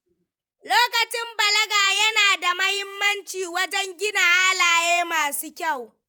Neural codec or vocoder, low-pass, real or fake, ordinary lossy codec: vocoder, 48 kHz, 128 mel bands, Vocos; none; fake; none